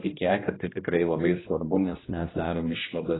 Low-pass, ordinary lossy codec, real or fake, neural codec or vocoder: 7.2 kHz; AAC, 16 kbps; fake; codec, 16 kHz, 1 kbps, X-Codec, HuBERT features, trained on general audio